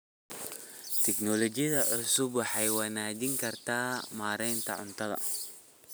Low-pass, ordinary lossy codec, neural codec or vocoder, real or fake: none; none; none; real